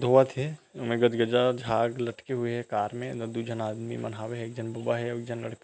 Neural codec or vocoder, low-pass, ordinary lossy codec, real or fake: none; none; none; real